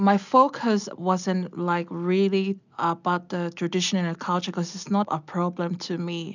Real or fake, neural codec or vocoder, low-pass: real; none; 7.2 kHz